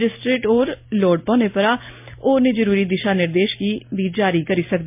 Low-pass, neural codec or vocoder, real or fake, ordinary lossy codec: 3.6 kHz; none; real; MP3, 32 kbps